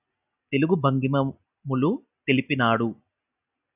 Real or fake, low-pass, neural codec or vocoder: real; 3.6 kHz; none